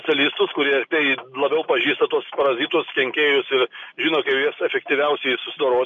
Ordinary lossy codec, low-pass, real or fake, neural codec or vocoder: MP3, 96 kbps; 7.2 kHz; real; none